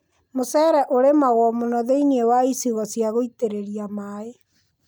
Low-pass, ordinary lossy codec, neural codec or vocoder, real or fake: none; none; none; real